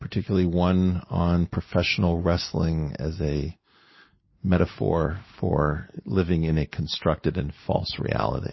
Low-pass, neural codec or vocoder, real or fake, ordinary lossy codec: 7.2 kHz; none; real; MP3, 24 kbps